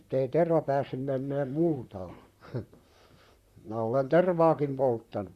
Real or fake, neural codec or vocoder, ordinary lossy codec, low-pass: fake; vocoder, 44.1 kHz, 128 mel bands, Pupu-Vocoder; Opus, 64 kbps; 14.4 kHz